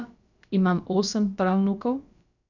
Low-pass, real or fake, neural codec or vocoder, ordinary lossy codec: 7.2 kHz; fake; codec, 16 kHz, about 1 kbps, DyCAST, with the encoder's durations; none